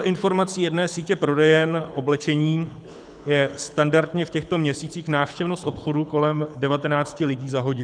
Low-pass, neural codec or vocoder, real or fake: 9.9 kHz; codec, 24 kHz, 6 kbps, HILCodec; fake